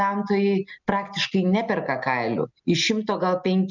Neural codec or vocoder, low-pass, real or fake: none; 7.2 kHz; real